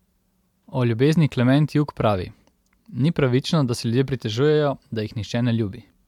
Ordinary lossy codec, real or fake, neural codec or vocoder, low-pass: MP3, 96 kbps; real; none; 19.8 kHz